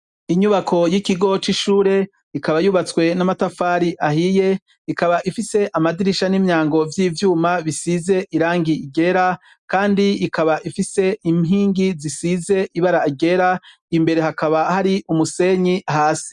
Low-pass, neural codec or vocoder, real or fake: 10.8 kHz; none; real